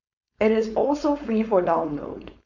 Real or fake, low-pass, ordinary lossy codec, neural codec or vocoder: fake; 7.2 kHz; none; codec, 16 kHz, 4.8 kbps, FACodec